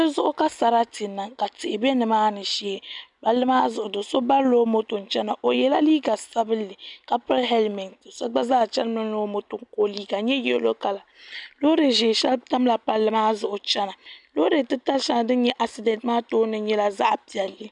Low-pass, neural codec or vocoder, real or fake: 10.8 kHz; none; real